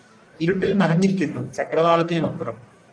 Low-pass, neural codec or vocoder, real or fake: 9.9 kHz; codec, 44.1 kHz, 1.7 kbps, Pupu-Codec; fake